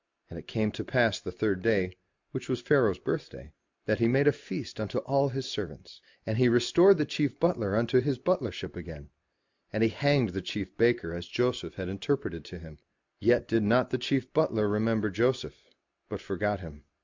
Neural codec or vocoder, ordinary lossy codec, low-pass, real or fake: none; MP3, 64 kbps; 7.2 kHz; real